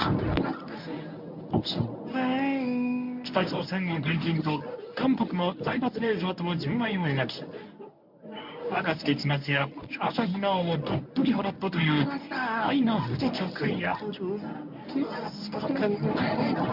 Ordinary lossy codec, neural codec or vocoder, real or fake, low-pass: MP3, 48 kbps; codec, 24 kHz, 0.9 kbps, WavTokenizer, medium speech release version 1; fake; 5.4 kHz